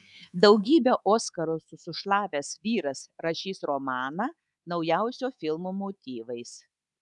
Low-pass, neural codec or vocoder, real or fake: 10.8 kHz; codec, 24 kHz, 3.1 kbps, DualCodec; fake